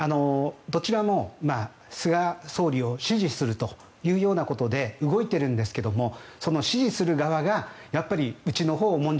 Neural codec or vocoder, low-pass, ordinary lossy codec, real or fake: none; none; none; real